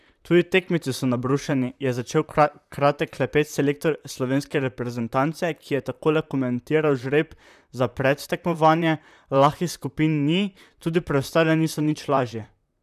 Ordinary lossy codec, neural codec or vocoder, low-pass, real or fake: none; vocoder, 44.1 kHz, 128 mel bands, Pupu-Vocoder; 14.4 kHz; fake